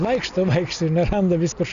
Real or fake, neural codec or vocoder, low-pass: real; none; 7.2 kHz